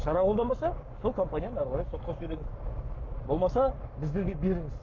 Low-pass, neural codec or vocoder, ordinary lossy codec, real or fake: 7.2 kHz; codec, 44.1 kHz, 7.8 kbps, Pupu-Codec; none; fake